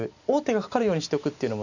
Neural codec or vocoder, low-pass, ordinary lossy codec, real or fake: none; 7.2 kHz; none; real